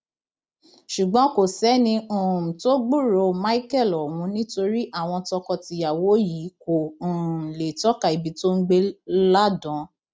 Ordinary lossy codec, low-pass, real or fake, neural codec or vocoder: none; none; real; none